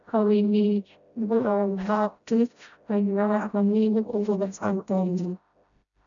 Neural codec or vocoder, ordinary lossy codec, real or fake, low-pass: codec, 16 kHz, 0.5 kbps, FreqCodec, smaller model; none; fake; 7.2 kHz